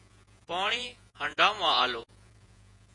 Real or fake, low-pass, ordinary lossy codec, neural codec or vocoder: fake; 10.8 kHz; MP3, 48 kbps; vocoder, 48 kHz, 128 mel bands, Vocos